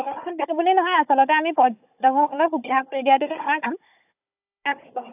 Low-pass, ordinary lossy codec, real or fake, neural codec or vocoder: 3.6 kHz; AAC, 32 kbps; fake; codec, 16 kHz, 16 kbps, FunCodec, trained on Chinese and English, 50 frames a second